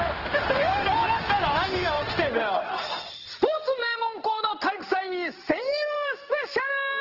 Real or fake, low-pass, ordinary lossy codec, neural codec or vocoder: fake; 5.4 kHz; Opus, 16 kbps; codec, 16 kHz in and 24 kHz out, 1 kbps, XY-Tokenizer